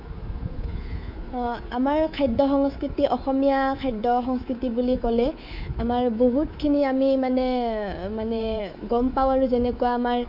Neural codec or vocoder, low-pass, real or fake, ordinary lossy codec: codec, 24 kHz, 3.1 kbps, DualCodec; 5.4 kHz; fake; none